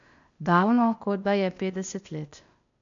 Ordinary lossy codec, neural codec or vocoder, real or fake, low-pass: MP3, 64 kbps; codec, 16 kHz, 0.8 kbps, ZipCodec; fake; 7.2 kHz